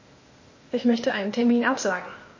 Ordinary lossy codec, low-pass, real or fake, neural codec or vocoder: MP3, 32 kbps; 7.2 kHz; fake; codec, 16 kHz, 0.8 kbps, ZipCodec